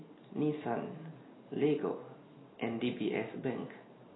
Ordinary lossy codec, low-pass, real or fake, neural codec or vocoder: AAC, 16 kbps; 7.2 kHz; real; none